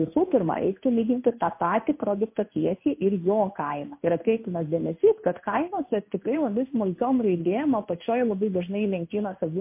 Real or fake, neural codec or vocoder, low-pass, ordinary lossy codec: fake; codec, 16 kHz in and 24 kHz out, 1 kbps, XY-Tokenizer; 3.6 kHz; MP3, 24 kbps